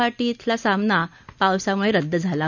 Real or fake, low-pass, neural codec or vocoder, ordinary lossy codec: real; 7.2 kHz; none; none